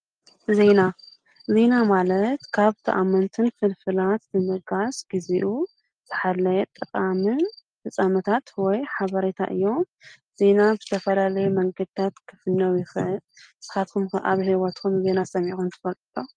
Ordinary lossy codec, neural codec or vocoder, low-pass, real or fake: Opus, 24 kbps; none; 9.9 kHz; real